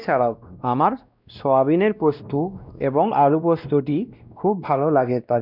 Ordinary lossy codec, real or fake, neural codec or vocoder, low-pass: none; fake; codec, 16 kHz, 1 kbps, X-Codec, WavLM features, trained on Multilingual LibriSpeech; 5.4 kHz